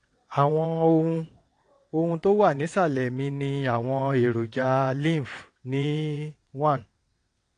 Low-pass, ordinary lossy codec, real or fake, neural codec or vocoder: 9.9 kHz; AAC, 48 kbps; fake; vocoder, 22.05 kHz, 80 mel bands, WaveNeXt